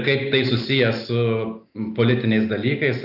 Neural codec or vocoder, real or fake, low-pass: none; real; 5.4 kHz